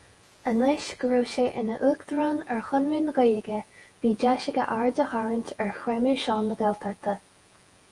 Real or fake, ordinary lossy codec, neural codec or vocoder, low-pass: fake; Opus, 32 kbps; vocoder, 48 kHz, 128 mel bands, Vocos; 10.8 kHz